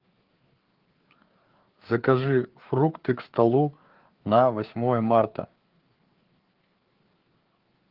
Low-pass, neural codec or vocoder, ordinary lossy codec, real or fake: 5.4 kHz; codec, 16 kHz, 4 kbps, FreqCodec, larger model; Opus, 16 kbps; fake